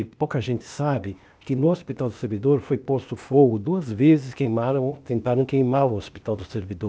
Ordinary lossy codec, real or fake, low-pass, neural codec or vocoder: none; fake; none; codec, 16 kHz, 0.8 kbps, ZipCodec